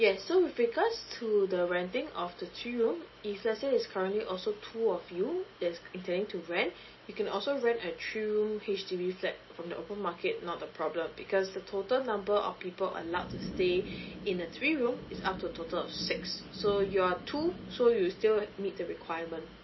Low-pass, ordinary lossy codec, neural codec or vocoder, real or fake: 7.2 kHz; MP3, 24 kbps; none; real